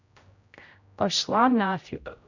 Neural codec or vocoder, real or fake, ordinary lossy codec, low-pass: codec, 16 kHz, 0.5 kbps, X-Codec, HuBERT features, trained on general audio; fake; none; 7.2 kHz